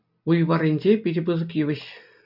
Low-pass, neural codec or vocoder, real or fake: 5.4 kHz; none; real